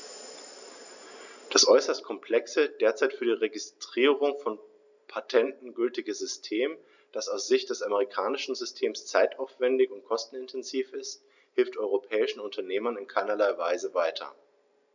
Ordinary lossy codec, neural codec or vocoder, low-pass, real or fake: none; none; 7.2 kHz; real